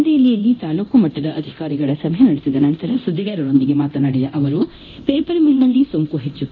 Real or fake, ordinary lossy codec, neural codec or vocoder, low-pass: fake; none; codec, 24 kHz, 0.9 kbps, DualCodec; 7.2 kHz